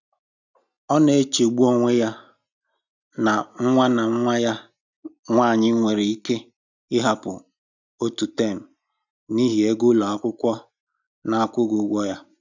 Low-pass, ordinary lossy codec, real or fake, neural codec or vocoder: 7.2 kHz; none; real; none